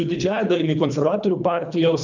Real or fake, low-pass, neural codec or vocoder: fake; 7.2 kHz; codec, 24 kHz, 3 kbps, HILCodec